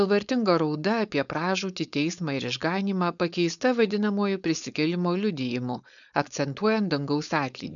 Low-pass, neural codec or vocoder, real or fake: 7.2 kHz; codec, 16 kHz, 4.8 kbps, FACodec; fake